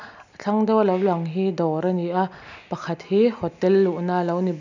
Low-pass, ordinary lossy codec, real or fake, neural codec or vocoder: 7.2 kHz; none; real; none